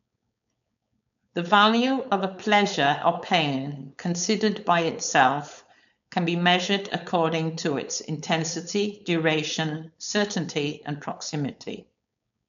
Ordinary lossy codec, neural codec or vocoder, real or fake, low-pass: none; codec, 16 kHz, 4.8 kbps, FACodec; fake; 7.2 kHz